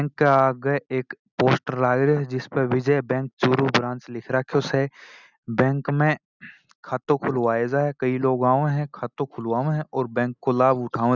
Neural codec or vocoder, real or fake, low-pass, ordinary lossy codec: none; real; 7.2 kHz; none